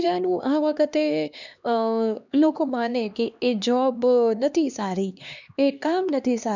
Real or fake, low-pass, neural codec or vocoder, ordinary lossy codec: fake; 7.2 kHz; codec, 16 kHz, 2 kbps, X-Codec, HuBERT features, trained on LibriSpeech; none